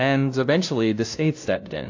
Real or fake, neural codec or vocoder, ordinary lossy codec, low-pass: fake; codec, 16 kHz, 0.5 kbps, FunCodec, trained on LibriTTS, 25 frames a second; AAC, 48 kbps; 7.2 kHz